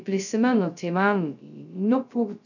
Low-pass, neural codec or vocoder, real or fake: 7.2 kHz; codec, 16 kHz, 0.2 kbps, FocalCodec; fake